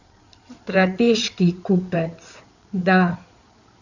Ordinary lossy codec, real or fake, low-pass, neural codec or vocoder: none; fake; 7.2 kHz; codec, 16 kHz in and 24 kHz out, 2.2 kbps, FireRedTTS-2 codec